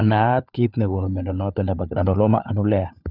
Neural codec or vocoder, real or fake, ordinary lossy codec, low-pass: codec, 16 kHz, 4 kbps, FunCodec, trained on LibriTTS, 50 frames a second; fake; none; 5.4 kHz